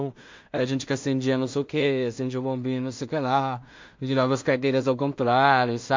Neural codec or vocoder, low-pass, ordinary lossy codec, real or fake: codec, 16 kHz in and 24 kHz out, 0.4 kbps, LongCat-Audio-Codec, two codebook decoder; 7.2 kHz; MP3, 48 kbps; fake